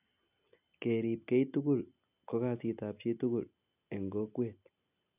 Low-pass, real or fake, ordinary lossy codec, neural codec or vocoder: 3.6 kHz; real; none; none